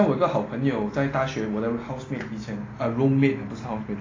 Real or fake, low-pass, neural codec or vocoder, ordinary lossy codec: real; 7.2 kHz; none; AAC, 32 kbps